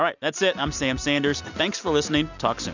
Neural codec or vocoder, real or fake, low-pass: none; real; 7.2 kHz